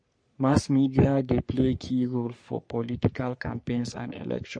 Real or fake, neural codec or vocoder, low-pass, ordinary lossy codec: fake; codec, 44.1 kHz, 3.4 kbps, Pupu-Codec; 9.9 kHz; MP3, 48 kbps